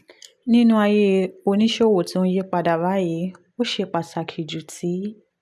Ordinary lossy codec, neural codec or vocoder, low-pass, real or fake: none; none; none; real